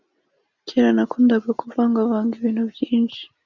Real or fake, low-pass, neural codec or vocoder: real; 7.2 kHz; none